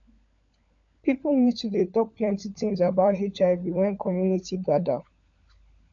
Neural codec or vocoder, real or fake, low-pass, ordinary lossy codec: codec, 16 kHz, 16 kbps, FunCodec, trained on LibriTTS, 50 frames a second; fake; 7.2 kHz; AAC, 64 kbps